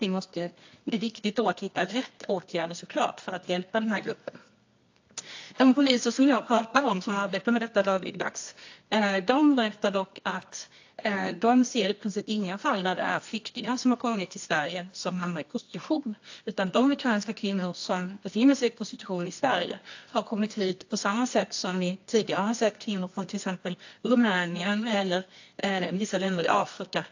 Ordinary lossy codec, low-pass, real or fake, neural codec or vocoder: AAC, 48 kbps; 7.2 kHz; fake; codec, 24 kHz, 0.9 kbps, WavTokenizer, medium music audio release